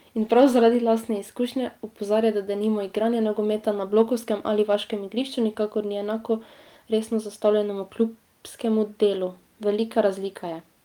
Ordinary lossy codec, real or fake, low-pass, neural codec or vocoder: Opus, 32 kbps; real; 19.8 kHz; none